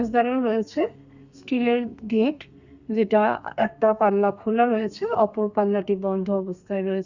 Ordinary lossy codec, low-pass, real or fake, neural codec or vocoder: Opus, 64 kbps; 7.2 kHz; fake; codec, 32 kHz, 1.9 kbps, SNAC